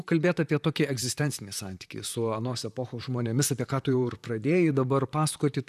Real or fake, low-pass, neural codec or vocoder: fake; 14.4 kHz; vocoder, 44.1 kHz, 128 mel bands, Pupu-Vocoder